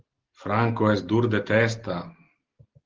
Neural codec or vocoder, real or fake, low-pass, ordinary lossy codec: none; real; 7.2 kHz; Opus, 16 kbps